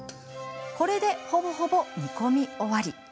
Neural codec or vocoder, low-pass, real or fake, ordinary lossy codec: none; none; real; none